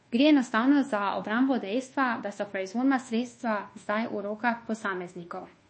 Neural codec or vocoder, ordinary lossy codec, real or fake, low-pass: codec, 24 kHz, 1.2 kbps, DualCodec; MP3, 32 kbps; fake; 9.9 kHz